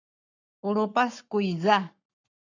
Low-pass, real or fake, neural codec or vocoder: 7.2 kHz; fake; codec, 44.1 kHz, 7.8 kbps, Pupu-Codec